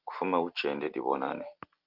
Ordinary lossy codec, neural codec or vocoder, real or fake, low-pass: Opus, 24 kbps; none; real; 5.4 kHz